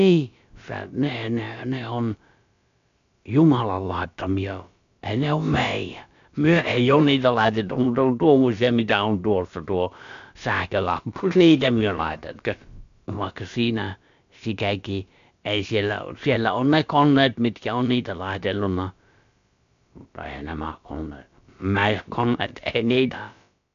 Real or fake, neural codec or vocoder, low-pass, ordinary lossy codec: fake; codec, 16 kHz, about 1 kbps, DyCAST, with the encoder's durations; 7.2 kHz; MP3, 64 kbps